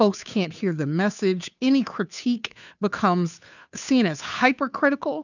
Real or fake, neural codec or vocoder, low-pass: fake; codec, 16 kHz, 2 kbps, FunCodec, trained on Chinese and English, 25 frames a second; 7.2 kHz